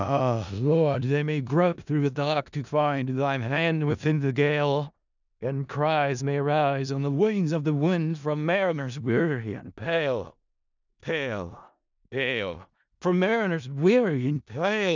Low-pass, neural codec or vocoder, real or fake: 7.2 kHz; codec, 16 kHz in and 24 kHz out, 0.4 kbps, LongCat-Audio-Codec, four codebook decoder; fake